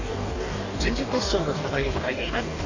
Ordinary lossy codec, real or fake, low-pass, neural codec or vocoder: none; fake; 7.2 kHz; codec, 44.1 kHz, 2.6 kbps, DAC